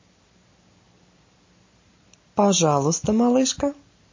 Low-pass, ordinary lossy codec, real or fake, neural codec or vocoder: 7.2 kHz; MP3, 32 kbps; real; none